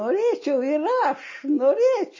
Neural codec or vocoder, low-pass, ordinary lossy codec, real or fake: none; 7.2 kHz; MP3, 32 kbps; real